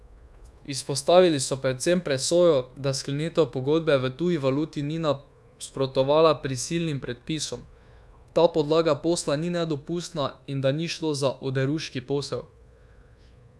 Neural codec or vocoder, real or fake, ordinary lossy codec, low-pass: codec, 24 kHz, 1.2 kbps, DualCodec; fake; none; none